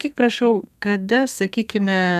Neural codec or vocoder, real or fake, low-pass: codec, 32 kHz, 1.9 kbps, SNAC; fake; 14.4 kHz